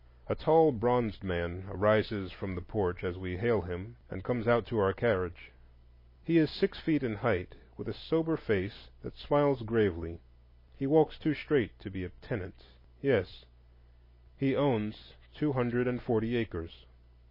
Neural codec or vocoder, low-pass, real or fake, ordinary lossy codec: none; 5.4 kHz; real; MP3, 24 kbps